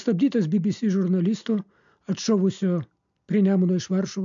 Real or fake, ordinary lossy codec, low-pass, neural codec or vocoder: real; MP3, 64 kbps; 7.2 kHz; none